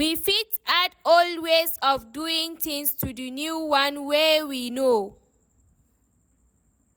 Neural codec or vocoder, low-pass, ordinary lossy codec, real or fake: none; none; none; real